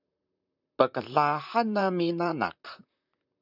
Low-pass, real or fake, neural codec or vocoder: 5.4 kHz; fake; vocoder, 44.1 kHz, 128 mel bands, Pupu-Vocoder